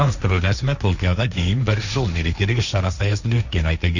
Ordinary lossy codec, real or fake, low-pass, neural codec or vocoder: none; fake; 7.2 kHz; codec, 16 kHz, 1.1 kbps, Voila-Tokenizer